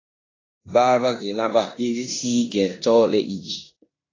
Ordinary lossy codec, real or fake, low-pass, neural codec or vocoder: AAC, 32 kbps; fake; 7.2 kHz; codec, 16 kHz in and 24 kHz out, 0.9 kbps, LongCat-Audio-Codec, four codebook decoder